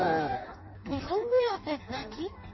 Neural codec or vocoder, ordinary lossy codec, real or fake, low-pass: codec, 16 kHz in and 24 kHz out, 0.6 kbps, FireRedTTS-2 codec; MP3, 24 kbps; fake; 7.2 kHz